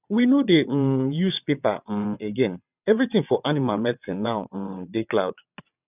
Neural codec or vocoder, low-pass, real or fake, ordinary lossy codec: vocoder, 22.05 kHz, 80 mel bands, WaveNeXt; 3.6 kHz; fake; none